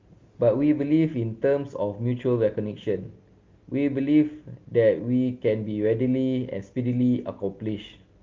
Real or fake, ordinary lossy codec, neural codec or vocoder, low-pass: real; Opus, 32 kbps; none; 7.2 kHz